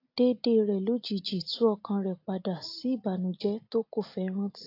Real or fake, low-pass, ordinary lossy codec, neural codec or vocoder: real; 5.4 kHz; AAC, 32 kbps; none